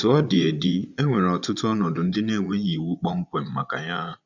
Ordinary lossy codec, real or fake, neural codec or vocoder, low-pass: none; fake; vocoder, 22.05 kHz, 80 mel bands, Vocos; 7.2 kHz